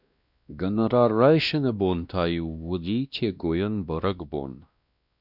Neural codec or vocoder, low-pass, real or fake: codec, 16 kHz, 1 kbps, X-Codec, WavLM features, trained on Multilingual LibriSpeech; 5.4 kHz; fake